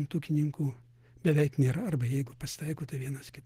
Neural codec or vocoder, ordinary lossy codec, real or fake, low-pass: none; Opus, 16 kbps; real; 14.4 kHz